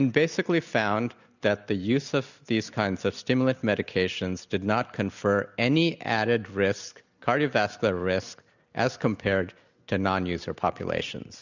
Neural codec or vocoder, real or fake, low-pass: none; real; 7.2 kHz